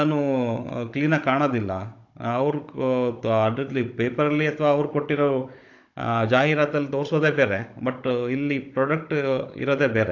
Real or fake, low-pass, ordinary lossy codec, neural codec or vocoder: fake; 7.2 kHz; AAC, 48 kbps; codec, 16 kHz, 16 kbps, FunCodec, trained on Chinese and English, 50 frames a second